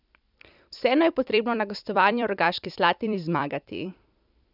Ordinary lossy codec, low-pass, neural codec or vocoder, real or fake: none; 5.4 kHz; vocoder, 44.1 kHz, 128 mel bands every 256 samples, BigVGAN v2; fake